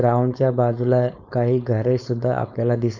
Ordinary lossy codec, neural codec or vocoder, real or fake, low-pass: none; codec, 16 kHz, 4.8 kbps, FACodec; fake; 7.2 kHz